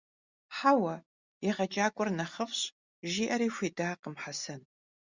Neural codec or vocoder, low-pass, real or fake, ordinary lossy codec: none; 7.2 kHz; real; Opus, 64 kbps